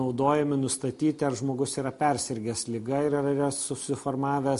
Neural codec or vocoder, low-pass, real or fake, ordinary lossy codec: none; 14.4 kHz; real; MP3, 48 kbps